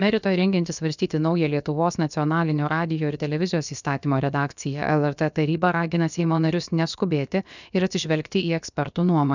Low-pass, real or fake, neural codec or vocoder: 7.2 kHz; fake; codec, 16 kHz, about 1 kbps, DyCAST, with the encoder's durations